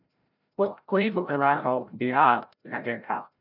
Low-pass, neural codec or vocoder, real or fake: 5.4 kHz; codec, 16 kHz, 0.5 kbps, FreqCodec, larger model; fake